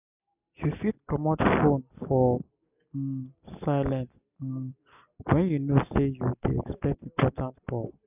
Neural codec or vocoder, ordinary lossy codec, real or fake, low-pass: none; none; real; 3.6 kHz